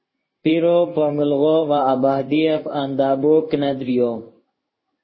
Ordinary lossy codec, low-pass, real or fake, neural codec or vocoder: MP3, 24 kbps; 7.2 kHz; fake; codec, 16 kHz in and 24 kHz out, 1 kbps, XY-Tokenizer